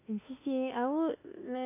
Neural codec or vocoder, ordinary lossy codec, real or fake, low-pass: autoencoder, 48 kHz, 32 numbers a frame, DAC-VAE, trained on Japanese speech; MP3, 24 kbps; fake; 3.6 kHz